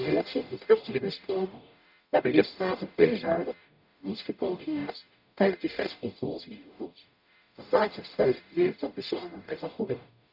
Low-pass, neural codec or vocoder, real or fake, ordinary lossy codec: 5.4 kHz; codec, 44.1 kHz, 0.9 kbps, DAC; fake; none